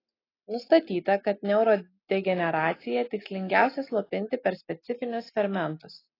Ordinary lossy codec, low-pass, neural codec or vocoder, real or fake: AAC, 24 kbps; 5.4 kHz; none; real